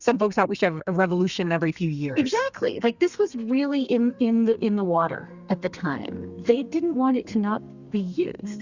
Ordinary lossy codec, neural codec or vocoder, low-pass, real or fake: Opus, 64 kbps; codec, 32 kHz, 1.9 kbps, SNAC; 7.2 kHz; fake